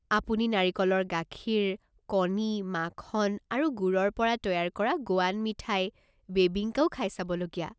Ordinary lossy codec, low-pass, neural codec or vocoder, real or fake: none; none; none; real